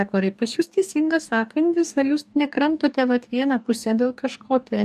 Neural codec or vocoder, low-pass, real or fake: codec, 44.1 kHz, 2.6 kbps, DAC; 14.4 kHz; fake